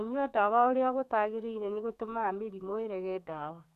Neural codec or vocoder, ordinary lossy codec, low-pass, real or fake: codec, 32 kHz, 1.9 kbps, SNAC; none; 14.4 kHz; fake